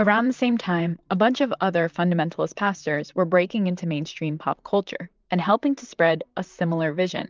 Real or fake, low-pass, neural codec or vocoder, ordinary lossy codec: fake; 7.2 kHz; vocoder, 44.1 kHz, 128 mel bands, Pupu-Vocoder; Opus, 24 kbps